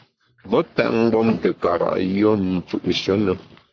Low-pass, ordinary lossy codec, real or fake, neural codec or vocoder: 7.2 kHz; AAC, 48 kbps; fake; codec, 44.1 kHz, 3.4 kbps, Pupu-Codec